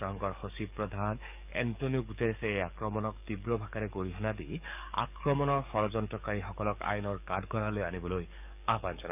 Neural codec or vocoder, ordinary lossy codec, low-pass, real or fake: codec, 44.1 kHz, 7.8 kbps, DAC; none; 3.6 kHz; fake